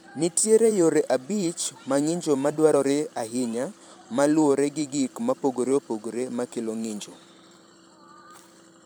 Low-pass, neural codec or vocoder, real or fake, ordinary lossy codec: none; vocoder, 44.1 kHz, 128 mel bands every 512 samples, BigVGAN v2; fake; none